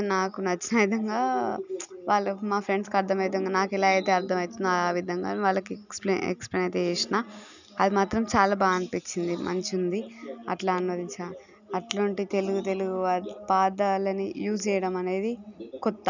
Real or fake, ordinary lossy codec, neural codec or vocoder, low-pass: real; none; none; 7.2 kHz